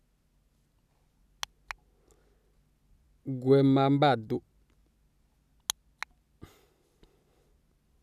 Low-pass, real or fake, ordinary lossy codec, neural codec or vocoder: 14.4 kHz; real; none; none